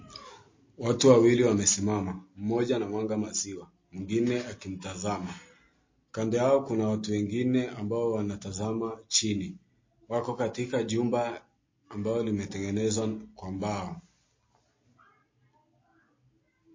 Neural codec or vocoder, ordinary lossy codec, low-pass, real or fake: none; MP3, 32 kbps; 7.2 kHz; real